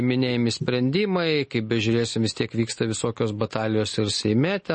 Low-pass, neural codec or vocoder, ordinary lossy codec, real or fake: 10.8 kHz; none; MP3, 32 kbps; real